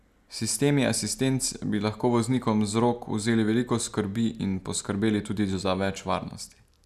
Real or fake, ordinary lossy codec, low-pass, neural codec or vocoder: real; none; 14.4 kHz; none